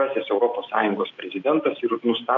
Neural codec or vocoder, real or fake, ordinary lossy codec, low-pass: none; real; AAC, 48 kbps; 7.2 kHz